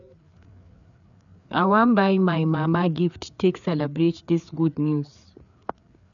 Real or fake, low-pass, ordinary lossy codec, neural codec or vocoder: fake; 7.2 kHz; none; codec, 16 kHz, 4 kbps, FreqCodec, larger model